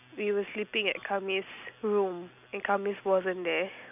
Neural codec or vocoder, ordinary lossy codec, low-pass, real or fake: none; none; 3.6 kHz; real